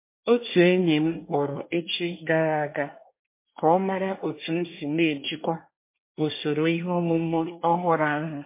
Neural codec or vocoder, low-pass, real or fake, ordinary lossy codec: codec, 24 kHz, 1 kbps, SNAC; 3.6 kHz; fake; MP3, 24 kbps